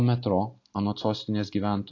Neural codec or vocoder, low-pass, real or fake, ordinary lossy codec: none; 7.2 kHz; real; AAC, 48 kbps